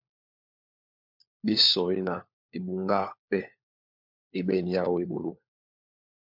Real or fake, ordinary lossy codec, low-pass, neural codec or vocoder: fake; MP3, 48 kbps; 5.4 kHz; codec, 16 kHz, 4 kbps, FunCodec, trained on LibriTTS, 50 frames a second